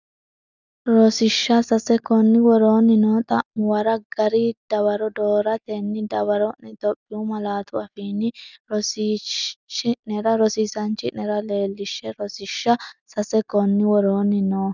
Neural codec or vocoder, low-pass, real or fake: none; 7.2 kHz; real